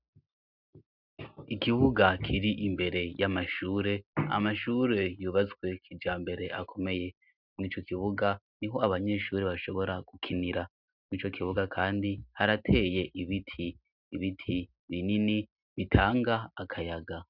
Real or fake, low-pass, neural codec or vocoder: real; 5.4 kHz; none